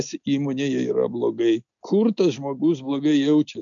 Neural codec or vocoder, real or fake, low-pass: none; real; 7.2 kHz